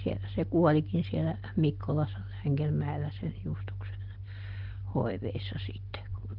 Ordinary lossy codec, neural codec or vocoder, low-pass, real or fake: Opus, 16 kbps; none; 5.4 kHz; real